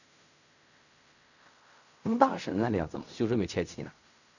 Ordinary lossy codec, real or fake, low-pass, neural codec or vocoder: none; fake; 7.2 kHz; codec, 16 kHz in and 24 kHz out, 0.4 kbps, LongCat-Audio-Codec, fine tuned four codebook decoder